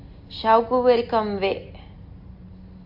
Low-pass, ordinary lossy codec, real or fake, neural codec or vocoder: 5.4 kHz; AAC, 48 kbps; real; none